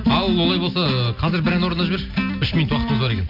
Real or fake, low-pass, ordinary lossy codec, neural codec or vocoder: real; 5.4 kHz; MP3, 48 kbps; none